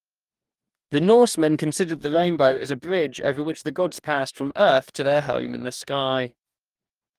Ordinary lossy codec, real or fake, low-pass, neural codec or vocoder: Opus, 32 kbps; fake; 14.4 kHz; codec, 44.1 kHz, 2.6 kbps, DAC